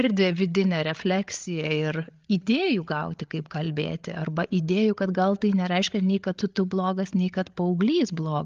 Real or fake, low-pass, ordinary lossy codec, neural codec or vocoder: fake; 7.2 kHz; Opus, 32 kbps; codec, 16 kHz, 8 kbps, FreqCodec, larger model